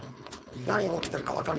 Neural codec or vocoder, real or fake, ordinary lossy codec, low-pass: codec, 16 kHz, 4.8 kbps, FACodec; fake; none; none